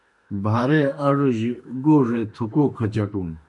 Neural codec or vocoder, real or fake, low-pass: autoencoder, 48 kHz, 32 numbers a frame, DAC-VAE, trained on Japanese speech; fake; 10.8 kHz